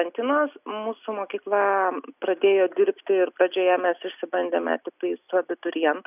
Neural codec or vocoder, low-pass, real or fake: none; 3.6 kHz; real